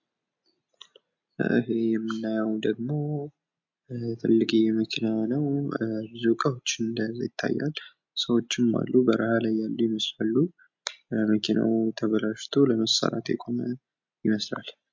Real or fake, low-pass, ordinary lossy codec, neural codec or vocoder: real; 7.2 kHz; MP3, 48 kbps; none